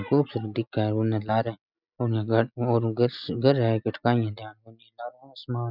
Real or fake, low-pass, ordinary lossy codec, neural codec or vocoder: real; 5.4 kHz; none; none